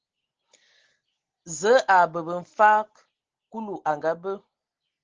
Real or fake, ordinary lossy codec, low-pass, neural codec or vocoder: real; Opus, 16 kbps; 7.2 kHz; none